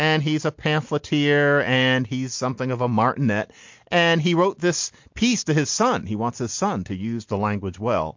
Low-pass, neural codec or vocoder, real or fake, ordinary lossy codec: 7.2 kHz; none; real; MP3, 48 kbps